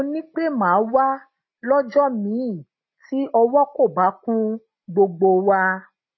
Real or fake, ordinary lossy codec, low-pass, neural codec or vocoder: real; MP3, 24 kbps; 7.2 kHz; none